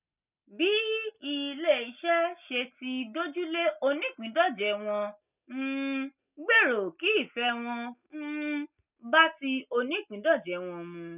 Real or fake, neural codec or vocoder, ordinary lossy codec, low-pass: real; none; none; 3.6 kHz